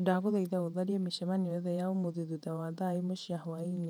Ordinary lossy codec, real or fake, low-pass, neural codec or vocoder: none; fake; 19.8 kHz; vocoder, 48 kHz, 128 mel bands, Vocos